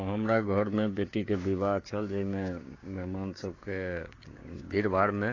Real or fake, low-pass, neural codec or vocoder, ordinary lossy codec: fake; 7.2 kHz; codec, 16 kHz, 6 kbps, DAC; AAC, 32 kbps